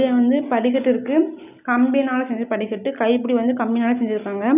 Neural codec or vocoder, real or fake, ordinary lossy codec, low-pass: none; real; none; 3.6 kHz